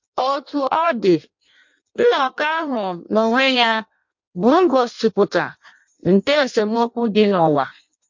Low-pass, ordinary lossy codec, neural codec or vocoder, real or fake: 7.2 kHz; MP3, 48 kbps; codec, 16 kHz in and 24 kHz out, 0.6 kbps, FireRedTTS-2 codec; fake